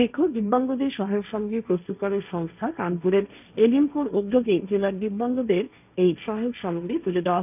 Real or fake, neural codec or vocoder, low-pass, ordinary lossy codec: fake; codec, 16 kHz, 1.1 kbps, Voila-Tokenizer; 3.6 kHz; none